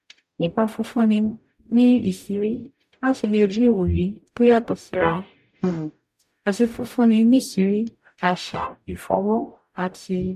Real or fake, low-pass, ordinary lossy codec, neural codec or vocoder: fake; 14.4 kHz; none; codec, 44.1 kHz, 0.9 kbps, DAC